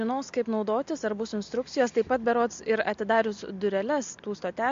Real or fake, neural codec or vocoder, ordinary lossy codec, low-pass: real; none; MP3, 48 kbps; 7.2 kHz